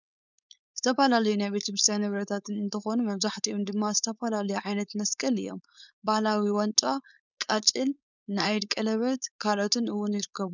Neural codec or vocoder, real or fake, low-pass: codec, 16 kHz, 4.8 kbps, FACodec; fake; 7.2 kHz